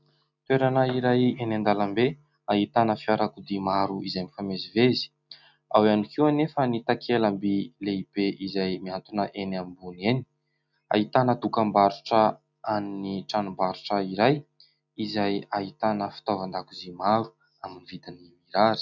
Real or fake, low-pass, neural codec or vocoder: real; 7.2 kHz; none